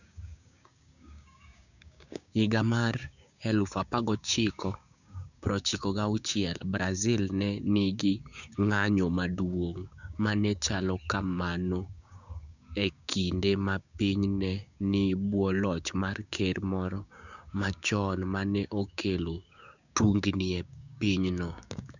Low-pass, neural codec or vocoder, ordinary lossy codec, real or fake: 7.2 kHz; codec, 16 kHz, 6 kbps, DAC; none; fake